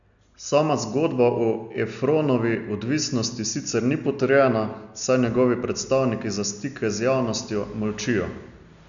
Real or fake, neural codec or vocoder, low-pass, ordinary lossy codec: real; none; 7.2 kHz; none